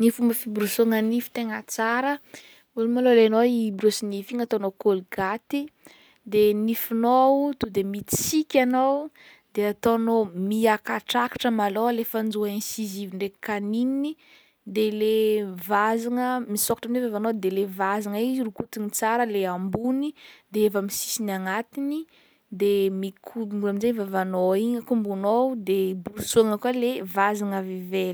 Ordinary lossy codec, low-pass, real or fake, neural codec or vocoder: none; none; real; none